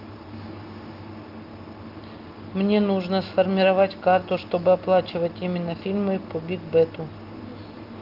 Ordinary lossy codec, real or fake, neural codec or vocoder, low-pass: Opus, 32 kbps; real; none; 5.4 kHz